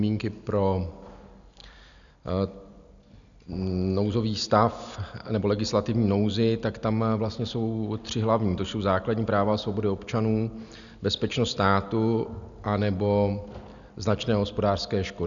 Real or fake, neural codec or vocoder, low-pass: real; none; 7.2 kHz